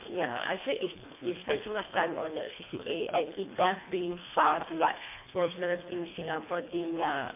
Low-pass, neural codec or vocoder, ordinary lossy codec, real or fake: 3.6 kHz; codec, 24 kHz, 1.5 kbps, HILCodec; MP3, 24 kbps; fake